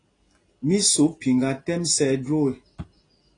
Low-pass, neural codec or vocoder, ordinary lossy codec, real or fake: 9.9 kHz; none; AAC, 32 kbps; real